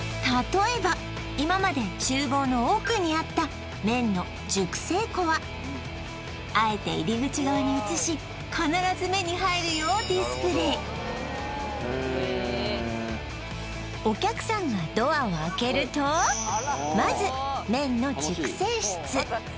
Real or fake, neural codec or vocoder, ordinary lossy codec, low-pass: real; none; none; none